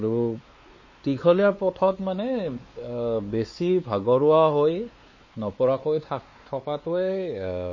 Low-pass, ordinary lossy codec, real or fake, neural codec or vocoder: 7.2 kHz; MP3, 32 kbps; fake; codec, 16 kHz, 4 kbps, X-Codec, WavLM features, trained on Multilingual LibriSpeech